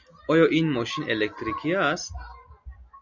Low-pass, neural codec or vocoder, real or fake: 7.2 kHz; none; real